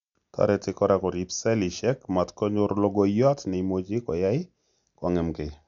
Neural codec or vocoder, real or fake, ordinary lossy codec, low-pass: none; real; MP3, 96 kbps; 7.2 kHz